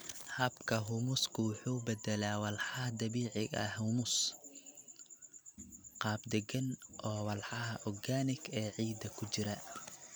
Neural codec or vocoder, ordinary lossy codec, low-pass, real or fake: none; none; none; real